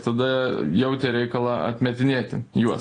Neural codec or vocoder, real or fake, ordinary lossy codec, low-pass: none; real; AAC, 32 kbps; 9.9 kHz